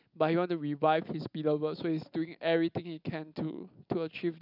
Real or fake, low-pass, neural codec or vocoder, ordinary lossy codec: real; 5.4 kHz; none; none